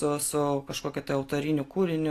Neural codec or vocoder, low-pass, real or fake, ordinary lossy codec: none; 14.4 kHz; real; AAC, 48 kbps